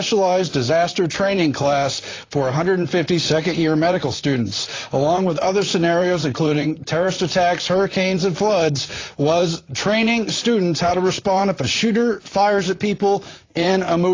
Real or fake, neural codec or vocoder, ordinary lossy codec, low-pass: fake; vocoder, 44.1 kHz, 128 mel bands, Pupu-Vocoder; AAC, 32 kbps; 7.2 kHz